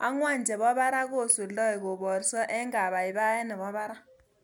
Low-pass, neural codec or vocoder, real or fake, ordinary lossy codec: none; none; real; none